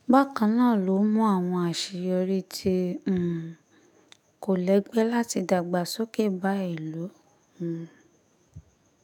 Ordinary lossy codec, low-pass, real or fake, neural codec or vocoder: none; 19.8 kHz; fake; autoencoder, 48 kHz, 128 numbers a frame, DAC-VAE, trained on Japanese speech